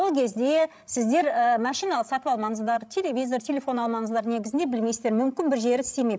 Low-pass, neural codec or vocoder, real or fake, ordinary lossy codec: none; codec, 16 kHz, 16 kbps, FreqCodec, larger model; fake; none